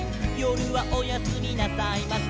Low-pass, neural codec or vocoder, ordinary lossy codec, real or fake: none; none; none; real